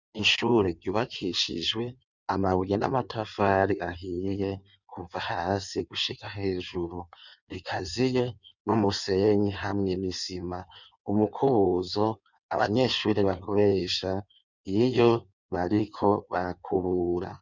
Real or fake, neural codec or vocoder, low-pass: fake; codec, 16 kHz in and 24 kHz out, 1.1 kbps, FireRedTTS-2 codec; 7.2 kHz